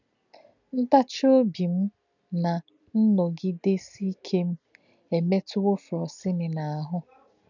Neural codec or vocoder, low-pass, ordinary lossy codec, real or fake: none; 7.2 kHz; none; real